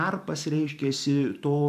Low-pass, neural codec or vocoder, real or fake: 14.4 kHz; vocoder, 44.1 kHz, 128 mel bands every 512 samples, BigVGAN v2; fake